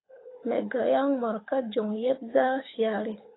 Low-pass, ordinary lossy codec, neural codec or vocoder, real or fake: 7.2 kHz; AAC, 16 kbps; codec, 24 kHz, 6 kbps, HILCodec; fake